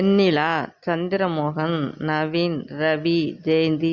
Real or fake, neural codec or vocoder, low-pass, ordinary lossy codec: real; none; 7.2 kHz; Opus, 64 kbps